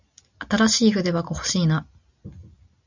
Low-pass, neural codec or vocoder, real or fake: 7.2 kHz; none; real